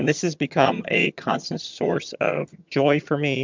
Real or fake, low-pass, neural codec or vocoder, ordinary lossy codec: fake; 7.2 kHz; vocoder, 22.05 kHz, 80 mel bands, HiFi-GAN; MP3, 64 kbps